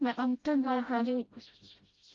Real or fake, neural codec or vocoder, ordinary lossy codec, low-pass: fake; codec, 16 kHz, 0.5 kbps, FreqCodec, smaller model; Opus, 32 kbps; 7.2 kHz